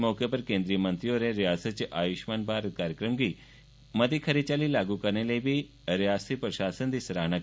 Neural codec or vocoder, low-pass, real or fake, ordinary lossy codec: none; none; real; none